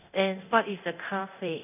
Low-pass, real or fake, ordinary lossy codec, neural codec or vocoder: 3.6 kHz; fake; none; codec, 24 kHz, 0.9 kbps, DualCodec